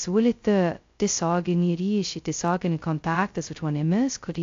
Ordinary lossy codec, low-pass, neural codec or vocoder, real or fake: AAC, 48 kbps; 7.2 kHz; codec, 16 kHz, 0.2 kbps, FocalCodec; fake